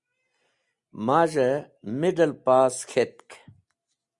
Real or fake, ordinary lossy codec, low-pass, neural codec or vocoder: real; Opus, 64 kbps; 10.8 kHz; none